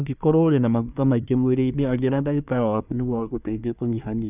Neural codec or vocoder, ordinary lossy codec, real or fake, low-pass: codec, 24 kHz, 1 kbps, SNAC; none; fake; 3.6 kHz